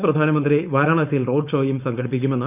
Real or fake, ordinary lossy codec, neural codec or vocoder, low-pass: fake; none; codec, 16 kHz, 4.8 kbps, FACodec; 3.6 kHz